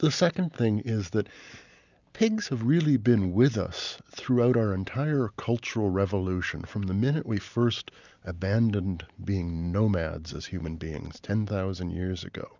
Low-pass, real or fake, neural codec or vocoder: 7.2 kHz; real; none